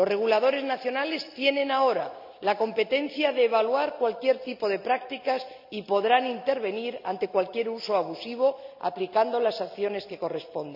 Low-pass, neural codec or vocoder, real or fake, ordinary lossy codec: 5.4 kHz; none; real; MP3, 48 kbps